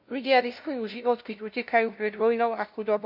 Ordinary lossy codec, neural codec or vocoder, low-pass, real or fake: MP3, 48 kbps; codec, 16 kHz, 0.5 kbps, FunCodec, trained on LibriTTS, 25 frames a second; 5.4 kHz; fake